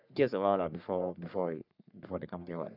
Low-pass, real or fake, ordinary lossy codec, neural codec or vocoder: 5.4 kHz; fake; none; codec, 44.1 kHz, 3.4 kbps, Pupu-Codec